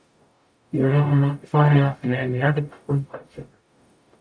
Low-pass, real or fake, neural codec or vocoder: 9.9 kHz; fake; codec, 44.1 kHz, 0.9 kbps, DAC